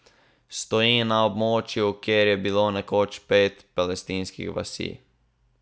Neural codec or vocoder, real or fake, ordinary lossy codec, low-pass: none; real; none; none